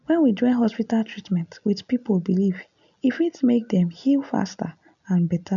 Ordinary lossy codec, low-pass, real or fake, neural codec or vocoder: none; 7.2 kHz; real; none